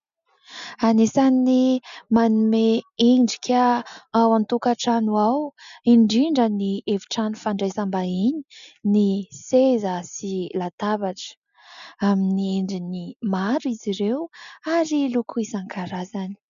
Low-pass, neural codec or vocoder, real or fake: 7.2 kHz; none; real